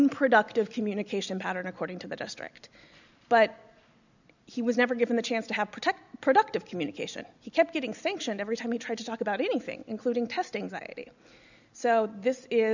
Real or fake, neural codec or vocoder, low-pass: real; none; 7.2 kHz